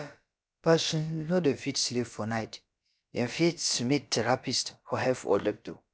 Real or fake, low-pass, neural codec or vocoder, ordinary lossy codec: fake; none; codec, 16 kHz, about 1 kbps, DyCAST, with the encoder's durations; none